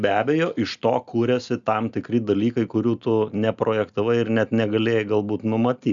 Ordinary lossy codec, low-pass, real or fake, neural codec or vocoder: Opus, 64 kbps; 7.2 kHz; real; none